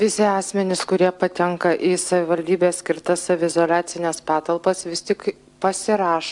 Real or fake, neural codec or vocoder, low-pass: real; none; 10.8 kHz